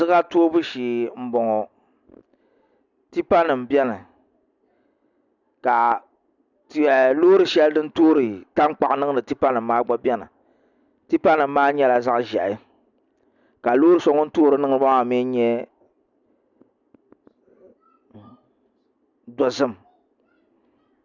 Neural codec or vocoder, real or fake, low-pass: none; real; 7.2 kHz